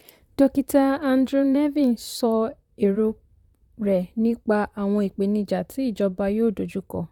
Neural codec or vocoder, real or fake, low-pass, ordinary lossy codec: vocoder, 44.1 kHz, 128 mel bands, Pupu-Vocoder; fake; 19.8 kHz; none